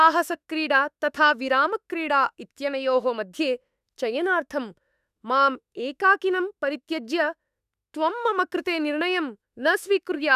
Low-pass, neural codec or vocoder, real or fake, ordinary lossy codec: 14.4 kHz; autoencoder, 48 kHz, 32 numbers a frame, DAC-VAE, trained on Japanese speech; fake; none